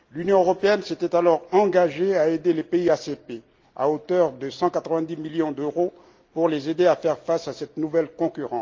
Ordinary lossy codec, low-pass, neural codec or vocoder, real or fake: Opus, 24 kbps; 7.2 kHz; none; real